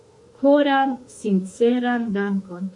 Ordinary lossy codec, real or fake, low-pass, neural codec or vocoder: MP3, 48 kbps; fake; 10.8 kHz; autoencoder, 48 kHz, 32 numbers a frame, DAC-VAE, trained on Japanese speech